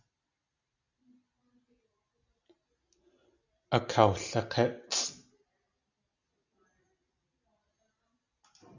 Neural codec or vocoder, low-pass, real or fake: none; 7.2 kHz; real